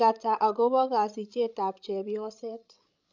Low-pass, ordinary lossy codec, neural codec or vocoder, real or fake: 7.2 kHz; none; codec, 16 kHz, 16 kbps, FreqCodec, larger model; fake